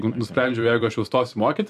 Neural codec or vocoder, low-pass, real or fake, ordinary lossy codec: vocoder, 44.1 kHz, 128 mel bands every 256 samples, BigVGAN v2; 14.4 kHz; fake; MP3, 64 kbps